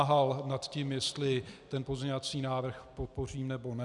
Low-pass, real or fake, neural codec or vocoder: 10.8 kHz; real; none